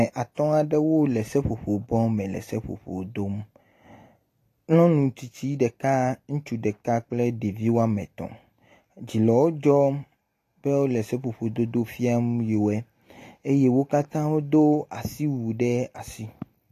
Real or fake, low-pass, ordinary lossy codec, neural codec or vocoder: real; 14.4 kHz; AAC, 48 kbps; none